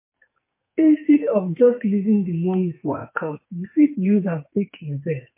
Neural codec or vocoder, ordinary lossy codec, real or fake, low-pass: codec, 44.1 kHz, 2.6 kbps, SNAC; MP3, 24 kbps; fake; 3.6 kHz